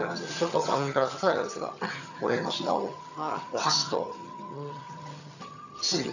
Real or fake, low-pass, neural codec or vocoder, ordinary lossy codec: fake; 7.2 kHz; vocoder, 22.05 kHz, 80 mel bands, HiFi-GAN; none